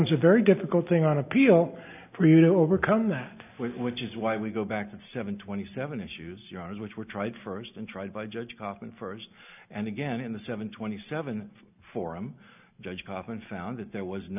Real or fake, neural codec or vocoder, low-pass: real; none; 3.6 kHz